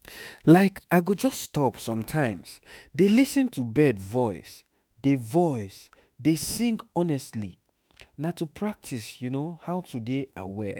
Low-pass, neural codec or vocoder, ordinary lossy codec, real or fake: none; autoencoder, 48 kHz, 32 numbers a frame, DAC-VAE, trained on Japanese speech; none; fake